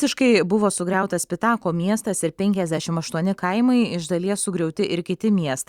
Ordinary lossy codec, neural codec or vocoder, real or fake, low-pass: Opus, 64 kbps; vocoder, 44.1 kHz, 128 mel bands every 256 samples, BigVGAN v2; fake; 19.8 kHz